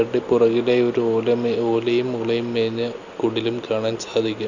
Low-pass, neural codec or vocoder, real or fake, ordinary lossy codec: 7.2 kHz; none; real; Opus, 64 kbps